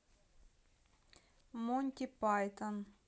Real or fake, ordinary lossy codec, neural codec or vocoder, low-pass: real; none; none; none